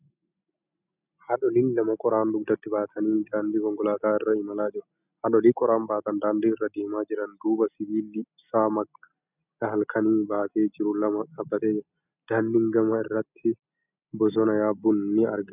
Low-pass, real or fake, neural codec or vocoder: 3.6 kHz; real; none